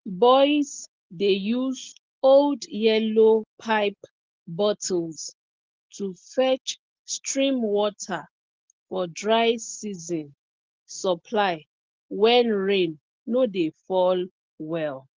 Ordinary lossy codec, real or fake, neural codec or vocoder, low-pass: Opus, 16 kbps; real; none; 7.2 kHz